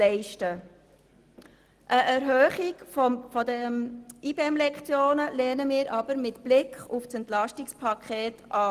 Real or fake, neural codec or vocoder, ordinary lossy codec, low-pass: real; none; Opus, 24 kbps; 14.4 kHz